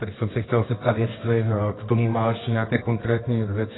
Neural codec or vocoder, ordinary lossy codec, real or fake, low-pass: codec, 24 kHz, 0.9 kbps, WavTokenizer, medium music audio release; AAC, 16 kbps; fake; 7.2 kHz